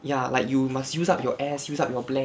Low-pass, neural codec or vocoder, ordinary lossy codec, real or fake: none; none; none; real